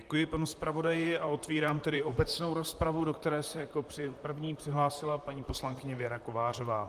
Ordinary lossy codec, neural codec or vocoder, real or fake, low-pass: Opus, 32 kbps; vocoder, 44.1 kHz, 128 mel bands, Pupu-Vocoder; fake; 14.4 kHz